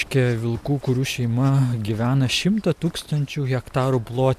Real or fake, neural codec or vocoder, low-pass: real; none; 14.4 kHz